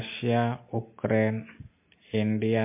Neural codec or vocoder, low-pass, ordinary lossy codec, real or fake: none; 3.6 kHz; MP3, 24 kbps; real